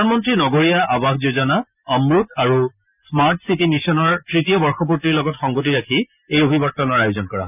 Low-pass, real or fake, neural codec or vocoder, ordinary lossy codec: 3.6 kHz; real; none; none